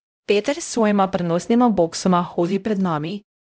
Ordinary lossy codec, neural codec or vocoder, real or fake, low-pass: none; codec, 16 kHz, 0.5 kbps, X-Codec, HuBERT features, trained on LibriSpeech; fake; none